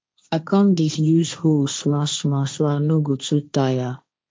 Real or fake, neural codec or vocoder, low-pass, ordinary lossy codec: fake; codec, 16 kHz, 1.1 kbps, Voila-Tokenizer; none; none